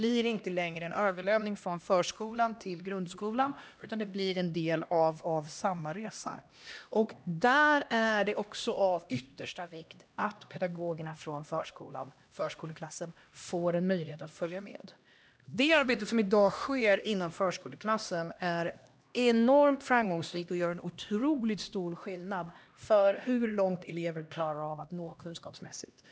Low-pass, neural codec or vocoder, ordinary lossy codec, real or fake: none; codec, 16 kHz, 1 kbps, X-Codec, HuBERT features, trained on LibriSpeech; none; fake